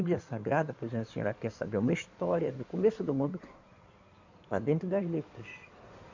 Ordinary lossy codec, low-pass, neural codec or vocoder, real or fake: MP3, 64 kbps; 7.2 kHz; codec, 16 kHz in and 24 kHz out, 2.2 kbps, FireRedTTS-2 codec; fake